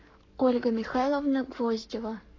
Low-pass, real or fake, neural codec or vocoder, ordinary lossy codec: 7.2 kHz; fake; codec, 44.1 kHz, 7.8 kbps, Pupu-Codec; AAC, 32 kbps